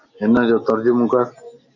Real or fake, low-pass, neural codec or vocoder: real; 7.2 kHz; none